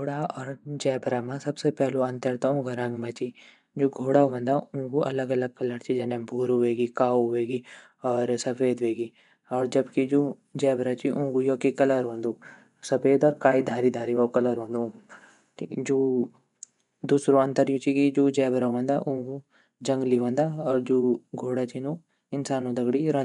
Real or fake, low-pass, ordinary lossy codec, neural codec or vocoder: fake; 10.8 kHz; none; vocoder, 24 kHz, 100 mel bands, Vocos